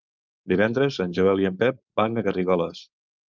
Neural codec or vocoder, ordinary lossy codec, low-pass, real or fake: codec, 16 kHz, 4.8 kbps, FACodec; Opus, 24 kbps; 7.2 kHz; fake